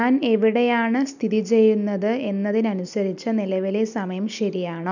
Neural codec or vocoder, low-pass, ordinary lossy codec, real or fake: none; 7.2 kHz; none; real